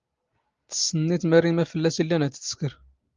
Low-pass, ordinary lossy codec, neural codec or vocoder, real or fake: 7.2 kHz; Opus, 32 kbps; none; real